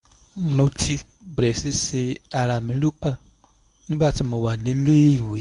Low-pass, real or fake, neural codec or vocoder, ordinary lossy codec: 10.8 kHz; fake; codec, 24 kHz, 0.9 kbps, WavTokenizer, medium speech release version 1; MP3, 64 kbps